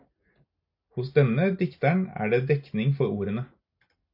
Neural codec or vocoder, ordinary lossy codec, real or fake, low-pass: none; AAC, 48 kbps; real; 5.4 kHz